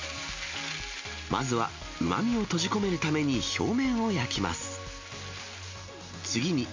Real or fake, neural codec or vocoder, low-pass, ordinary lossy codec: real; none; 7.2 kHz; MP3, 48 kbps